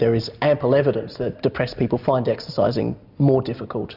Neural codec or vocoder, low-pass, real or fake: none; 5.4 kHz; real